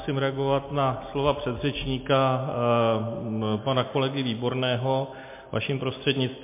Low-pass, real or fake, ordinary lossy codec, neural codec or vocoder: 3.6 kHz; real; MP3, 24 kbps; none